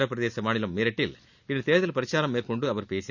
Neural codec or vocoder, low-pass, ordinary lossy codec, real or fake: none; 7.2 kHz; none; real